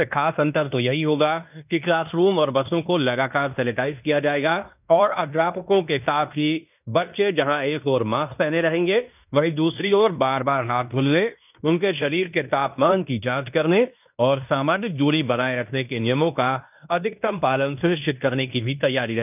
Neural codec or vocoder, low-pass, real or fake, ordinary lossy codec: codec, 16 kHz in and 24 kHz out, 0.9 kbps, LongCat-Audio-Codec, fine tuned four codebook decoder; 3.6 kHz; fake; none